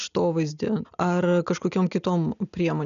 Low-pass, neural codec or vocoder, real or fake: 7.2 kHz; none; real